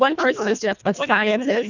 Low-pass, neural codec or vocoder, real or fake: 7.2 kHz; codec, 24 kHz, 1.5 kbps, HILCodec; fake